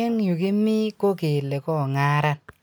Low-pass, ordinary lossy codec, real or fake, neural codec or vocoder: none; none; real; none